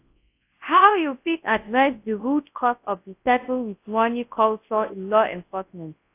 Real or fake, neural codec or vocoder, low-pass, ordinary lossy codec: fake; codec, 24 kHz, 0.9 kbps, WavTokenizer, large speech release; 3.6 kHz; AAC, 24 kbps